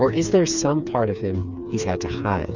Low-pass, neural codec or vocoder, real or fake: 7.2 kHz; codec, 16 kHz, 4 kbps, FreqCodec, smaller model; fake